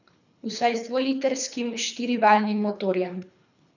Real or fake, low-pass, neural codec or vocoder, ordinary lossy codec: fake; 7.2 kHz; codec, 24 kHz, 3 kbps, HILCodec; none